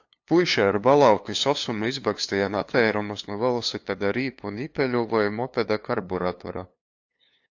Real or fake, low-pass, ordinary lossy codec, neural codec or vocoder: fake; 7.2 kHz; AAC, 48 kbps; codec, 16 kHz, 2 kbps, FunCodec, trained on LibriTTS, 25 frames a second